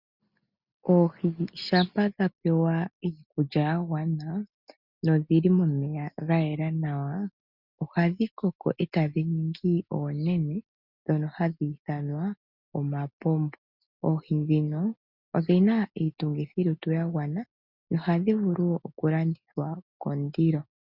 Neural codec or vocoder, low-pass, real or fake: none; 5.4 kHz; real